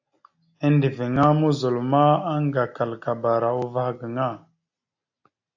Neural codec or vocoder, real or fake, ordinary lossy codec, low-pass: none; real; AAC, 48 kbps; 7.2 kHz